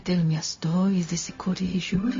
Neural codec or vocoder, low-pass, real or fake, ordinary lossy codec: codec, 16 kHz, 0.4 kbps, LongCat-Audio-Codec; 7.2 kHz; fake; MP3, 32 kbps